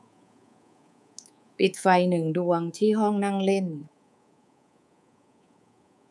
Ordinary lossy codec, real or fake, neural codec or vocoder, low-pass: none; fake; codec, 24 kHz, 3.1 kbps, DualCodec; none